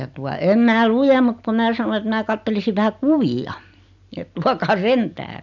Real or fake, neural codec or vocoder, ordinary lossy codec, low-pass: real; none; none; 7.2 kHz